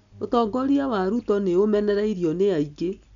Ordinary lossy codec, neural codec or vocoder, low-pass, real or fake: none; none; 7.2 kHz; real